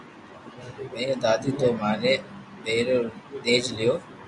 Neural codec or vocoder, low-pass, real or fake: none; 10.8 kHz; real